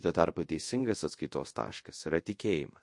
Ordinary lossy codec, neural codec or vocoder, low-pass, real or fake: MP3, 48 kbps; codec, 24 kHz, 0.5 kbps, DualCodec; 10.8 kHz; fake